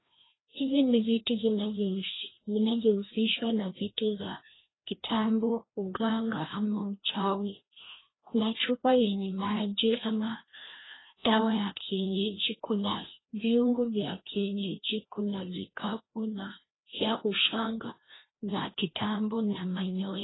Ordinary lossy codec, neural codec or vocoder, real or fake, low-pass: AAC, 16 kbps; codec, 16 kHz, 1 kbps, FreqCodec, larger model; fake; 7.2 kHz